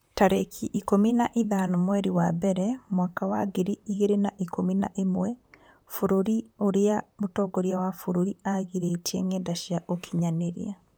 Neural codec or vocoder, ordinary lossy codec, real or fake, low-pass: vocoder, 44.1 kHz, 128 mel bands, Pupu-Vocoder; none; fake; none